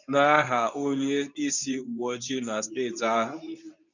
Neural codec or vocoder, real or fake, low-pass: codec, 24 kHz, 0.9 kbps, WavTokenizer, medium speech release version 2; fake; 7.2 kHz